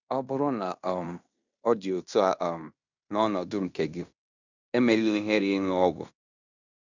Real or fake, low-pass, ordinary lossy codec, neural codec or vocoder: fake; 7.2 kHz; none; codec, 16 kHz in and 24 kHz out, 0.9 kbps, LongCat-Audio-Codec, fine tuned four codebook decoder